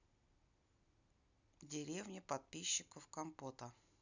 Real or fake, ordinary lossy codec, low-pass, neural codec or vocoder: real; none; 7.2 kHz; none